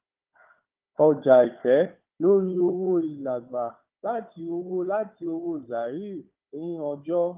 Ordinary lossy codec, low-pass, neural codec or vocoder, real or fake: Opus, 24 kbps; 3.6 kHz; codec, 16 kHz, 4 kbps, FunCodec, trained on Chinese and English, 50 frames a second; fake